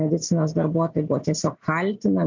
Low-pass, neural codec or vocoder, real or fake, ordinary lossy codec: 7.2 kHz; none; real; MP3, 64 kbps